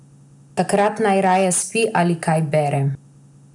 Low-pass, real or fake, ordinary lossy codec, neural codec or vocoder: 10.8 kHz; real; none; none